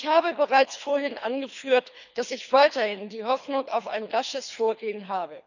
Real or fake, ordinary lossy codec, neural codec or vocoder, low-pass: fake; none; codec, 24 kHz, 3 kbps, HILCodec; 7.2 kHz